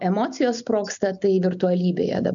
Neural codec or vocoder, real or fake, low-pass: none; real; 7.2 kHz